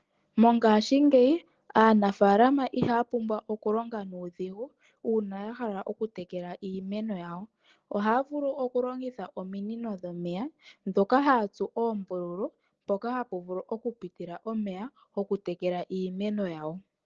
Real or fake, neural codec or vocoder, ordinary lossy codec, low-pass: real; none; Opus, 16 kbps; 7.2 kHz